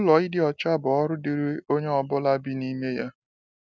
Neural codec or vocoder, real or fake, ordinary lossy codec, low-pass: none; real; none; none